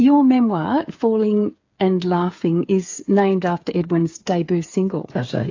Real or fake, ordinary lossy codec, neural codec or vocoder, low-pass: fake; AAC, 48 kbps; codec, 16 kHz, 8 kbps, FreqCodec, smaller model; 7.2 kHz